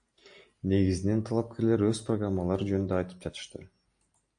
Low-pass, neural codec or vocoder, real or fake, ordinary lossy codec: 9.9 kHz; none; real; AAC, 64 kbps